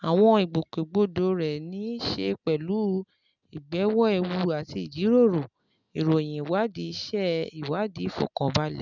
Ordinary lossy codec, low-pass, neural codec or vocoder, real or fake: none; 7.2 kHz; none; real